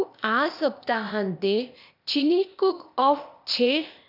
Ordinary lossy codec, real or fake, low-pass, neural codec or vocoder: none; fake; 5.4 kHz; codec, 16 kHz, 0.8 kbps, ZipCodec